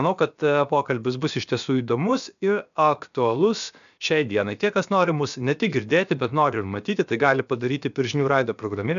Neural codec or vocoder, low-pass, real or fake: codec, 16 kHz, about 1 kbps, DyCAST, with the encoder's durations; 7.2 kHz; fake